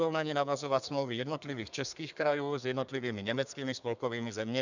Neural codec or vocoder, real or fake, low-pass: codec, 32 kHz, 1.9 kbps, SNAC; fake; 7.2 kHz